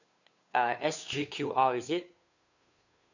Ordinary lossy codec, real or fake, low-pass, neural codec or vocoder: none; fake; 7.2 kHz; codec, 16 kHz, 2 kbps, FunCodec, trained on Chinese and English, 25 frames a second